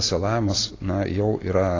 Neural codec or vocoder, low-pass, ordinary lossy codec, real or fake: none; 7.2 kHz; AAC, 32 kbps; real